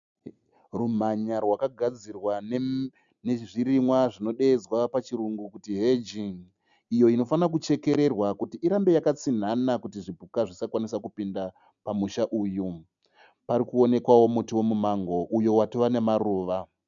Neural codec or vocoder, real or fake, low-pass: none; real; 7.2 kHz